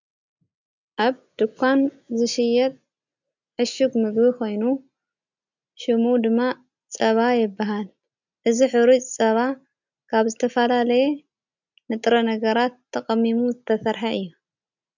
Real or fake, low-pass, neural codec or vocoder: real; 7.2 kHz; none